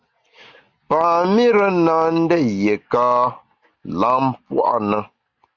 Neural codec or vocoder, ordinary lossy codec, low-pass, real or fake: none; Opus, 64 kbps; 7.2 kHz; real